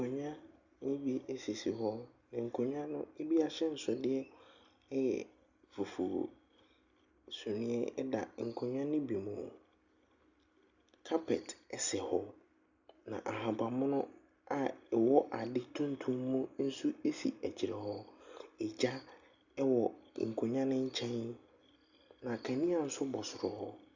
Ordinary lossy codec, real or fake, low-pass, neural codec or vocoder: Opus, 64 kbps; real; 7.2 kHz; none